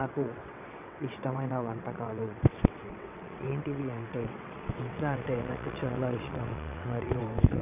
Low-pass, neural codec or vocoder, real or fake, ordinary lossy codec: 3.6 kHz; vocoder, 22.05 kHz, 80 mel bands, WaveNeXt; fake; none